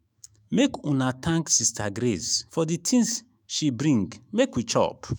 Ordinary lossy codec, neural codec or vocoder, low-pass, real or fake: none; autoencoder, 48 kHz, 128 numbers a frame, DAC-VAE, trained on Japanese speech; none; fake